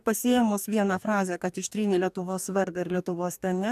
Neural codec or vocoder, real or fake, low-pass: codec, 44.1 kHz, 2.6 kbps, DAC; fake; 14.4 kHz